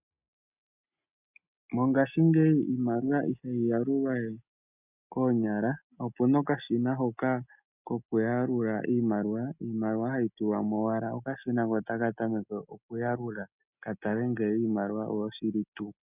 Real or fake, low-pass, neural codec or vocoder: real; 3.6 kHz; none